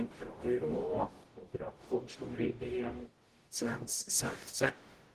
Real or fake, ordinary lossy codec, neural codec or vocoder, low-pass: fake; Opus, 16 kbps; codec, 44.1 kHz, 0.9 kbps, DAC; 14.4 kHz